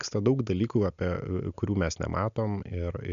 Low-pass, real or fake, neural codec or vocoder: 7.2 kHz; real; none